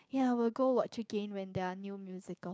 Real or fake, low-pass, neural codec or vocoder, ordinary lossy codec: fake; none; codec, 16 kHz, 6 kbps, DAC; none